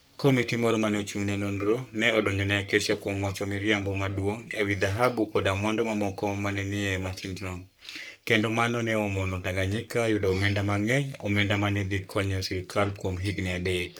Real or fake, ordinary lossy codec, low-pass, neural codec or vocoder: fake; none; none; codec, 44.1 kHz, 3.4 kbps, Pupu-Codec